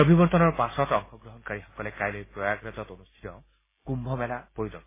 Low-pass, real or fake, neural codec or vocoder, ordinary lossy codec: 3.6 kHz; real; none; MP3, 16 kbps